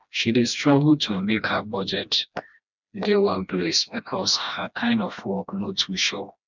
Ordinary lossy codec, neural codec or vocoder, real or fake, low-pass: none; codec, 16 kHz, 1 kbps, FreqCodec, smaller model; fake; 7.2 kHz